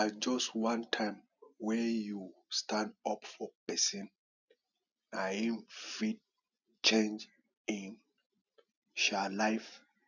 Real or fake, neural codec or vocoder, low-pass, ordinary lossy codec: real; none; 7.2 kHz; none